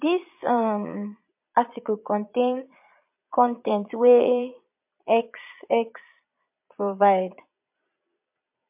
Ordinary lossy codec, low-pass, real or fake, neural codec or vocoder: MP3, 32 kbps; 3.6 kHz; fake; vocoder, 22.05 kHz, 80 mel bands, Vocos